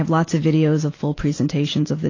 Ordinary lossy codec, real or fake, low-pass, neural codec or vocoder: AAC, 32 kbps; real; 7.2 kHz; none